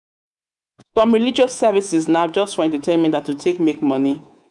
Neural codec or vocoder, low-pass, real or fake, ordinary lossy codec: codec, 24 kHz, 3.1 kbps, DualCodec; 10.8 kHz; fake; none